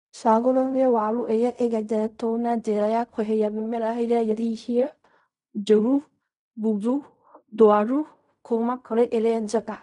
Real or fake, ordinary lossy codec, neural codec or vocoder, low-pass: fake; none; codec, 16 kHz in and 24 kHz out, 0.4 kbps, LongCat-Audio-Codec, fine tuned four codebook decoder; 10.8 kHz